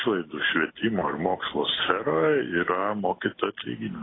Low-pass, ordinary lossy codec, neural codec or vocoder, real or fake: 7.2 kHz; AAC, 16 kbps; none; real